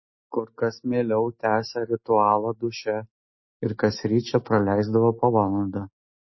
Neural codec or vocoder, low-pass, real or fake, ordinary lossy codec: none; 7.2 kHz; real; MP3, 24 kbps